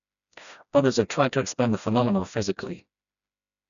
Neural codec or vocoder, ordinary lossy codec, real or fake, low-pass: codec, 16 kHz, 1 kbps, FreqCodec, smaller model; none; fake; 7.2 kHz